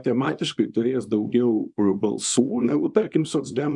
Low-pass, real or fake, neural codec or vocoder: 10.8 kHz; fake; codec, 24 kHz, 0.9 kbps, WavTokenizer, small release